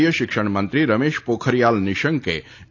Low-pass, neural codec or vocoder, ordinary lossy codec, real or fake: 7.2 kHz; none; AAC, 48 kbps; real